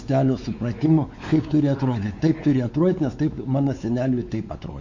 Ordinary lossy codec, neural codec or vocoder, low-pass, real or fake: MP3, 48 kbps; codec, 16 kHz, 4 kbps, X-Codec, WavLM features, trained on Multilingual LibriSpeech; 7.2 kHz; fake